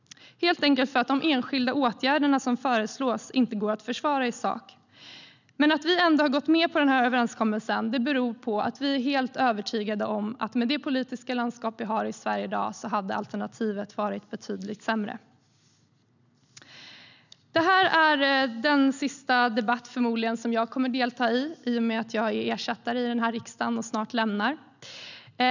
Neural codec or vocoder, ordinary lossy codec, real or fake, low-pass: none; none; real; 7.2 kHz